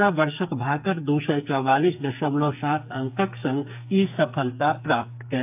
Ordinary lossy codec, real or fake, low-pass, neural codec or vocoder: AAC, 32 kbps; fake; 3.6 kHz; codec, 44.1 kHz, 2.6 kbps, SNAC